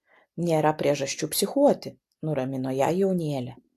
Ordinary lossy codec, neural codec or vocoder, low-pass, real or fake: AAC, 64 kbps; none; 14.4 kHz; real